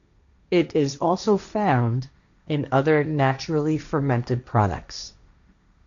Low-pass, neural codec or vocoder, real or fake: 7.2 kHz; codec, 16 kHz, 1.1 kbps, Voila-Tokenizer; fake